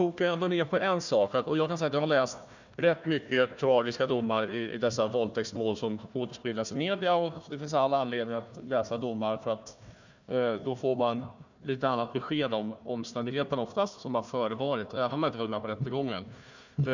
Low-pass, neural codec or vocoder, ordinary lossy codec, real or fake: 7.2 kHz; codec, 16 kHz, 1 kbps, FunCodec, trained on Chinese and English, 50 frames a second; none; fake